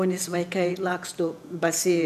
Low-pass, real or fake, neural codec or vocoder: 14.4 kHz; fake; vocoder, 48 kHz, 128 mel bands, Vocos